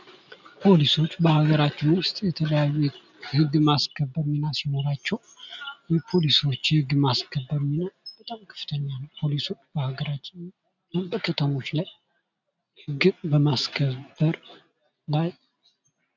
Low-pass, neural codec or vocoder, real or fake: 7.2 kHz; none; real